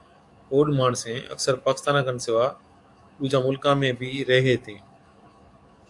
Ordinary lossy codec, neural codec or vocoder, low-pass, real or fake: AAC, 64 kbps; codec, 44.1 kHz, 7.8 kbps, DAC; 10.8 kHz; fake